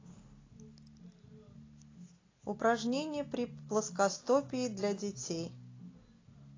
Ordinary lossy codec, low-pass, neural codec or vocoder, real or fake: AAC, 32 kbps; 7.2 kHz; none; real